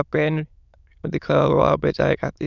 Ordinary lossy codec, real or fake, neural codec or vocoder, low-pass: none; fake; autoencoder, 22.05 kHz, a latent of 192 numbers a frame, VITS, trained on many speakers; 7.2 kHz